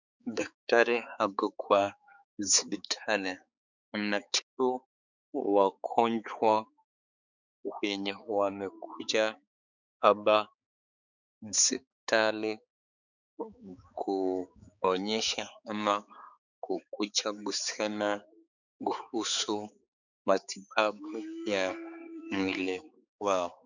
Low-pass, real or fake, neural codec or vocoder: 7.2 kHz; fake; codec, 16 kHz, 4 kbps, X-Codec, HuBERT features, trained on balanced general audio